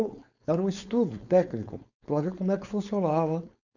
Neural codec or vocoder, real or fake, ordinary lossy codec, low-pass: codec, 16 kHz, 4.8 kbps, FACodec; fake; none; 7.2 kHz